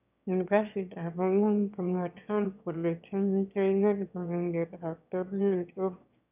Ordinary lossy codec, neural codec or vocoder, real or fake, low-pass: none; autoencoder, 22.05 kHz, a latent of 192 numbers a frame, VITS, trained on one speaker; fake; 3.6 kHz